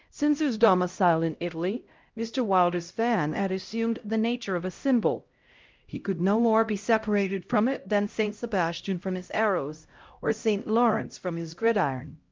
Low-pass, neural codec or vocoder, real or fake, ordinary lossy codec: 7.2 kHz; codec, 16 kHz, 0.5 kbps, X-Codec, HuBERT features, trained on LibriSpeech; fake; Opus, 24 kbps